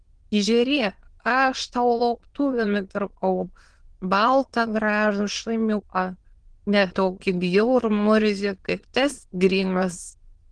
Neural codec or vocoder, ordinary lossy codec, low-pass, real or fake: autoencoder, 22.05 kHz, a latent of 192 numbers a frame, VITS, trained on many speakers; Opus, 16 kbps; 9.9 kHz; fake